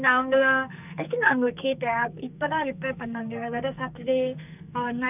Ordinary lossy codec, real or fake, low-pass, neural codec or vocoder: none; fake; 3.6 kHz; codec, 44.1 kHz, 2.6 kbps, SNAC